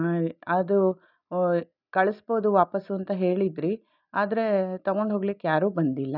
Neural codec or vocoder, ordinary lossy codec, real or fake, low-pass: none; none; real; 5.4 kHz